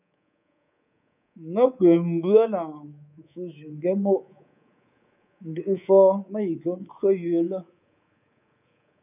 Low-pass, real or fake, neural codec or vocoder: 3.6 kHz; fake; codec, 24 kHz, 3.1 kbps, DualCodec